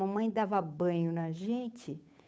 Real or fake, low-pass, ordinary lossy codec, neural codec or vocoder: fake; none; none; codec, 16 kHz, 8 kbps, FunCodec, trained on Chinese and English, 25 frames a second